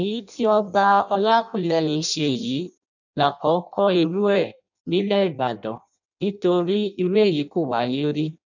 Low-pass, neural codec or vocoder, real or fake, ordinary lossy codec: 7.2 kHz; codec, 16 kHz in and 24 kHz out, 0.6 kbps, FireRedTTS-2 codec; fake; none